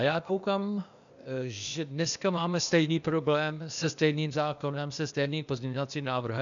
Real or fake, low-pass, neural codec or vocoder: fake; 7.2 kHz; codec, 16 kHz, 0.8 kbps, ZipCodec